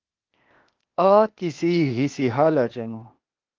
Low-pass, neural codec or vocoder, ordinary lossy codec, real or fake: 7.2 kHz; codec, 16 kHz, 0.8 kbps, ZipCodec; Opus, 32 kbps; fake